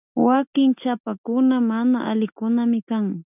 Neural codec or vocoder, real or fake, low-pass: none; real; 3.6 kHz